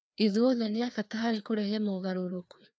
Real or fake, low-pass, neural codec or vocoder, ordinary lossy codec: fake; none; codec, 16 kHz, 2 kbps, FreqCodec, larger model; none